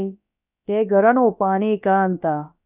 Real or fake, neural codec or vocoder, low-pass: fake; codec, 16 kHz, about 1 kbps, DyCAST, with the encoder's durations; 3.6 kHz